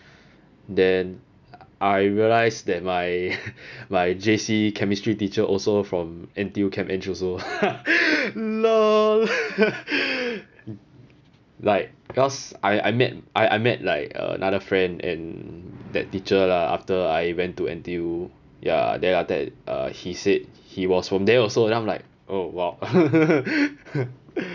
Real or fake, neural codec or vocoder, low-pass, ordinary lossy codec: real; none; 7.2 kHz; none